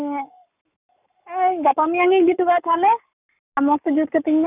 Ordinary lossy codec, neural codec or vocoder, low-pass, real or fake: none; codec, 44.1 kHz, 7.8 kbps, Pupu-Codec; 3.6 kHz; fake